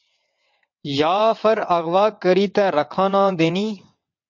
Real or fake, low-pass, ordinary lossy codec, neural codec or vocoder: fake; 7.2 kHz; MP3, 48 kbps; vocoder, 22.05 kHz, 80 mel bands, WaveNeXt